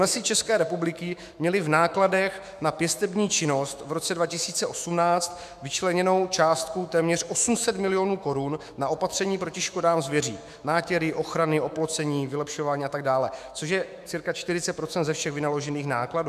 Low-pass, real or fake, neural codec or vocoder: 14.4 kHz; fake; autoencoder, 48 kHz, 128 numbers a frame, DAC-VAE, trained on Japanese speech